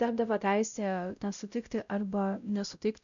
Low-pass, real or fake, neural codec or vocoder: 7.2 kHz; fake; codec, 16 kHz, 0.5 kbps, X-Codec, WavLM features, trained on Multilingual LibriSpeech